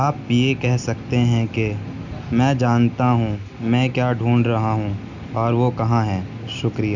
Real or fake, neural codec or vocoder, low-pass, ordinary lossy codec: real; none; 7.2 kHz; none